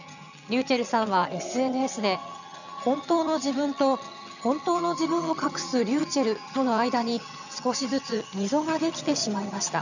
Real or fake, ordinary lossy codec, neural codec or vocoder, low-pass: fake; none; vocoder, 22.05 kHz, 80 mel bands, HiFi-GAN; 7.2 kHz